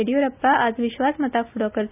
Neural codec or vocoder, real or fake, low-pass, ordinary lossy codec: none; real; 3.6 kHz; none